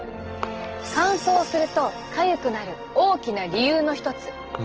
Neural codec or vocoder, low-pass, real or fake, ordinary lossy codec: none; 7.2 kHz; real; Opus, 16 kbps